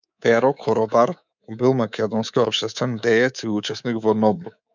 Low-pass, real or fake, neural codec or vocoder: 7.2 kHz; fake; codec, 24 kHz, 3.1 kbps, DualCodec